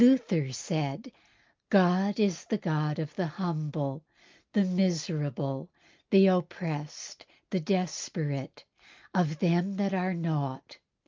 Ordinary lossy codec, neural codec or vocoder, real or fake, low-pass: Opus, 24 kbps; none; real; 7.2 kHz